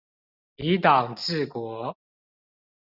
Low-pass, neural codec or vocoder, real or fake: 5.4 kHz; none; real